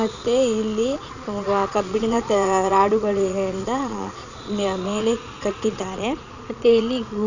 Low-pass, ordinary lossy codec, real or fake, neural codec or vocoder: 7.2 kHz; none; fake; vocoder, 22.05 kHz, 80 mel bands, Vocos